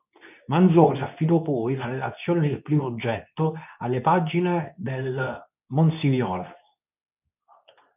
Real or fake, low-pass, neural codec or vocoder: fake; 3.6 kHz; codec, 24 kHz, 0.9 kbps, WavTokenizer, medium speech release version 2